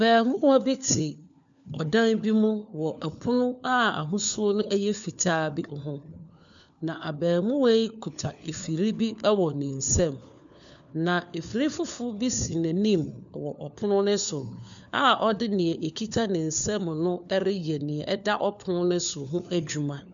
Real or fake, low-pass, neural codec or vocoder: fake; 7.2 kHz; codec, 16 kHz, 4 kbps, FunCodec, trained on LibriTTS, 50 frames a second